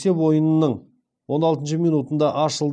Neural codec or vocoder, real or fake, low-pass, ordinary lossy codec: none; real; 9.9 kHz; none